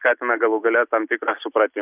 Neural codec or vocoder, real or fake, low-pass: none; real; 3.6 kHz